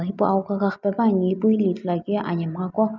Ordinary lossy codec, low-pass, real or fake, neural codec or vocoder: none; 7.2 kHz; real; none